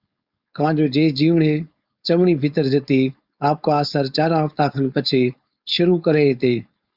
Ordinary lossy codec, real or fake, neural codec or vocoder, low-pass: Opus, 64 kbps; fake; codec, 16 kHz, 4.8 kbps, FACodec; 5.4 kHz